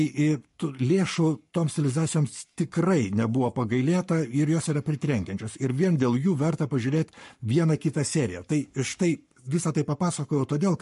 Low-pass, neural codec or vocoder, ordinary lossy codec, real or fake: 14.4 kHz; codec, 44.1 kHz, 7.8 kbps, Pupu-Codec; MP3, 48 kbps; fake